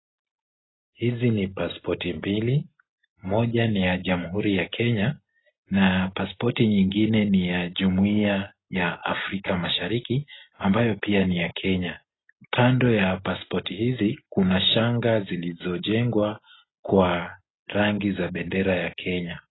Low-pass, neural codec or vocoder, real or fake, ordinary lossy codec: 7.2 kHz; none; real; AAC, 16 kbps